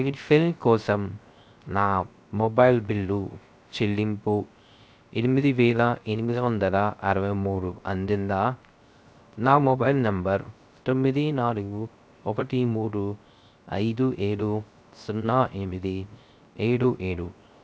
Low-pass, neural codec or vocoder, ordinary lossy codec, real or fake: none; codec, 16 kHz, 0.3 kbps, FocalCodec; none; fake